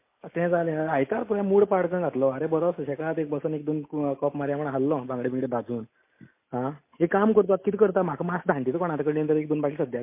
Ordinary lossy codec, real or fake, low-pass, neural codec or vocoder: MP3, 24 kbps; real; 3.6 kHz; none